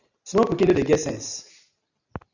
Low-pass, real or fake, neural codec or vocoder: 7.2 kHz; real; none